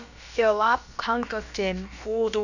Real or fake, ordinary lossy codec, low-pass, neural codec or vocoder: fake; none; 7.2 kHz; codec, 16 kHz, about 1 kbps, DyCAST, with the encoder's durations